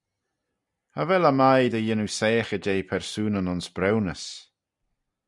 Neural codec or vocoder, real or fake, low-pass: none; real; 10.8 kHz